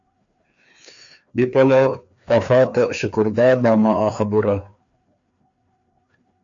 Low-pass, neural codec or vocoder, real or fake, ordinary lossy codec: 7.2 kHz; codec, 16 kHz, 2 kbps, FreqCodec, larger model; fake; AAC, 64 kbps